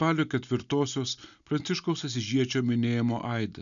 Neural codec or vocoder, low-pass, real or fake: none; 7.2 kHz; real